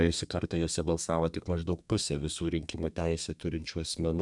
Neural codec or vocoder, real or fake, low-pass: codec, 32 kHz, 1.9 kbps, SNAC; fake; 10.8 kHz